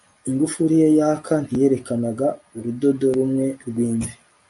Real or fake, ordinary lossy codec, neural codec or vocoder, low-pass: real; MP3, 96 kbps; none; 10.8 kHz